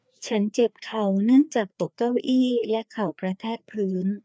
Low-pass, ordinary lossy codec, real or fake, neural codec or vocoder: none; none; fake; codec, 16 kHz, 2 kbps, FreqCodec, larger model